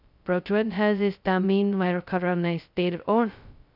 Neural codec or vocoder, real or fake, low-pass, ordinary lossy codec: codec, 16 kHz, 0.2 kbps, FocalCodec; fake; 5.4 kHz; none